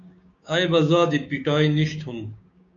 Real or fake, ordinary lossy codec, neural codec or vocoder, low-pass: fake; MP3, 64 kbps; codec, 16 kHz, 6 kbps, DAC; 7.2 kHz